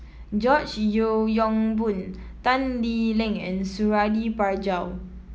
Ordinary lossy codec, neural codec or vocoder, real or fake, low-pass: none; none; real; none